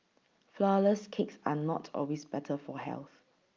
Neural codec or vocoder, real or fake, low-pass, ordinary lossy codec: none; real; 7.2 kHz; Opus, 24 kbps